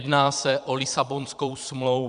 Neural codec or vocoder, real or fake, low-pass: vocoder, 22.05 kHz, 80 mel bands, Vocos; fake; 9.9 kHz